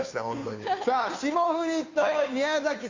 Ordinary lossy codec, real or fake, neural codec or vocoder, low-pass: AAC, 48 kbps; fake; codec, 16 kHz, 2 kbps, FunCodec, trained on Chinese and English, 25 frames a second; 7.2 kHz